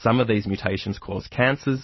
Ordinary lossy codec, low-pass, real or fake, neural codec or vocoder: MP3, 24 kbps; 7.2 kHz; real; none